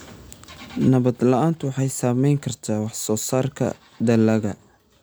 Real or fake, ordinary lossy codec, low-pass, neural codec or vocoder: real; none; none; none